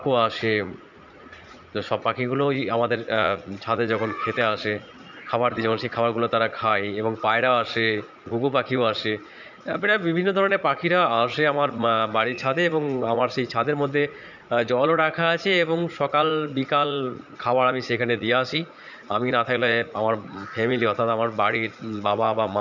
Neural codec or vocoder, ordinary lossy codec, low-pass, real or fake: vocoder, 44.1 kHz, 80 mel bands, Vocos; none; 7.2 kHz; fake